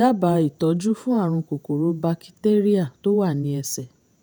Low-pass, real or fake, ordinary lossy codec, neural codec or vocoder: none; fake; none; vocoder, 48 kHz, 128 mel bands, Vocos